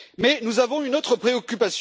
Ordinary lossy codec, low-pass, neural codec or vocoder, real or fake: none; none; none; real